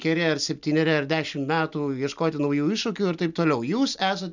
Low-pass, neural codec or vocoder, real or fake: 7.2 kHz; none; real